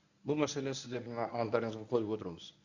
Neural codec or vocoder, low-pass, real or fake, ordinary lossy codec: codec, 24 kHz, 0.9 kbps, WavTokenizer, medium speech release version 1; 7.2 kHz; fake; none